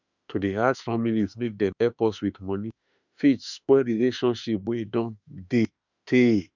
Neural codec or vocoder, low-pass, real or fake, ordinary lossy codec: autoencoder, 48 kHz, 32 numbers a frame, DAC-VAE, trained on Japanese speech; 7.2 kHz; fake; none